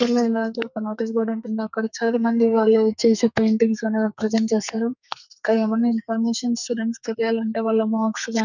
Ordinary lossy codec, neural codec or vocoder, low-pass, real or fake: none; codec, 44.1 kHz, 2.6 kbps, SNAC; 7.2 kHz; fake